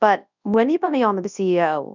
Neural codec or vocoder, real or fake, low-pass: codec, 24 kHz, 0.9 kbps, WavTokenizer, large speech release; fake; 7.2 kHz